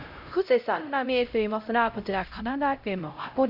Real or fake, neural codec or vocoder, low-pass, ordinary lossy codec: fake; codec, 16 kHz, 0.5 kbps, X-Codec, HuBERT features, trained on LibriSpeech; 5.4 kHz; none